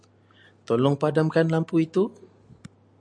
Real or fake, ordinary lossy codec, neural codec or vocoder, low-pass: real; AAC, 64 kbps; none; 9.9 kHz